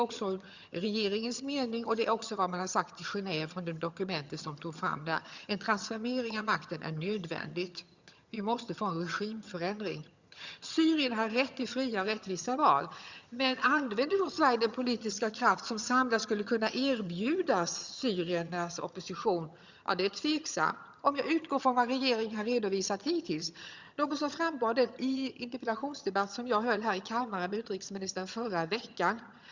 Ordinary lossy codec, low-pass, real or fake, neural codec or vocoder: Opus, 64 kbps; 7.2 kHz; fake; vocoder, 22.05 kHz, 80 mel bands, HiFi-GAN